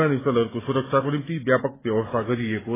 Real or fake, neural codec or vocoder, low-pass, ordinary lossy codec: real; none; 3.6 kHz; AAC, 16 kbps